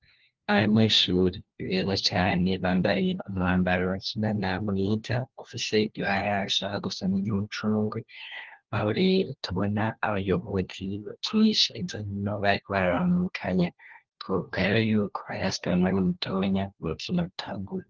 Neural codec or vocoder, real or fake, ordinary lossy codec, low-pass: codec, 16 kHz, 1 kbps, FunCodec, trained on LibriTTS, 50 frames a second; fake; Opus, 16 kbps; 7.2 kHz